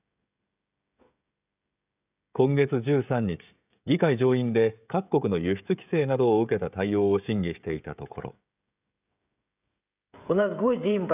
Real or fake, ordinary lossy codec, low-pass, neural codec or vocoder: fake; none; 3.6 kHz; codec, 16 kHz, 16 kbps, FreqCodec, smaller model